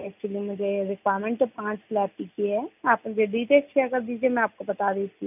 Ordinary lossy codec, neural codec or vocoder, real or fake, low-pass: none; none; real; 3.6 kHz